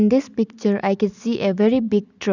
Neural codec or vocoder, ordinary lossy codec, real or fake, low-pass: none; none; real; 7.2 kHz